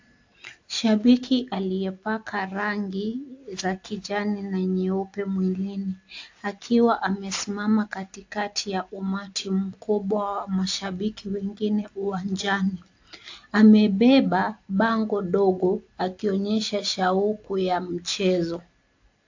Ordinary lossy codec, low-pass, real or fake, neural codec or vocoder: AAC, 48 kbps; 7.2 kHz; real; none